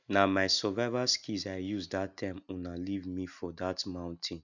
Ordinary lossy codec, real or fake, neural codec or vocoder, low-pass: none; real; none; 7.2 kHz